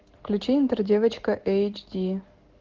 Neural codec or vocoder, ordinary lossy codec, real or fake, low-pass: none; Opus, 16 kbps; real; 7.2 kHz